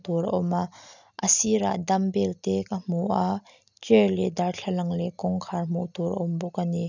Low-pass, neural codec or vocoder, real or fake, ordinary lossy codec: 7.2 kHz; none; real; none